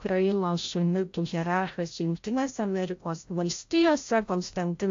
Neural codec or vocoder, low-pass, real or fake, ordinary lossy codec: codec, 16 kHz, 0.5 kbps, FreqCodec, larger model; 7.2 kHz; fake; AAC, 48 kbps